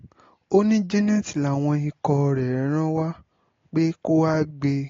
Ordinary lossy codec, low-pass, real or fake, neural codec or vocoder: AAC, 32 kbps; 7.2 kHz; real; none